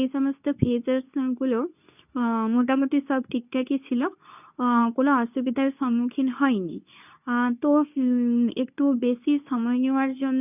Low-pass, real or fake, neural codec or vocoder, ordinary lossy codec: 3.6 kHz; fake; codec, 24 kHz, 0.9 kbps, WavTokenizer, medium speech release version 2; none